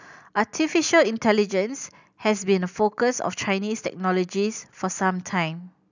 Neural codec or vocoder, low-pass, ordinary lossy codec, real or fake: none; 7.2 kHz; none; real